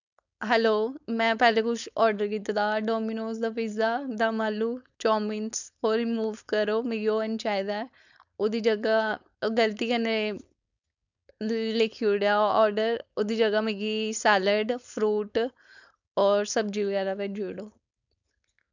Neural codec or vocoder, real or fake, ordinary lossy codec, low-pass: codec, 16 kHz, 4.8 kbps, FACodec; fake; none; 7.2 kHz